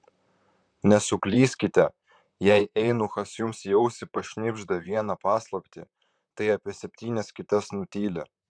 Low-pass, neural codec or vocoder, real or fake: 9.9 kHz; vocoder, 44.1 kHz, 128 mel bands, Pupu-Vocoder; fake